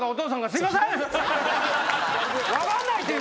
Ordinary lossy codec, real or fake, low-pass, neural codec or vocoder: none; real; none; none